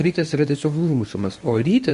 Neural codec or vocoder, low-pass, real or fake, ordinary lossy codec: codec, 24 kHz, 0.9 kbps, WavTokenizer, medium speech release version 1; 10.8 kHz; fake; MP3, 48 kbps